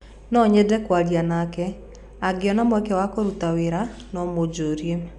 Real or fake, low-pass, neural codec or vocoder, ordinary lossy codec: real; 10.8 kHz; none; none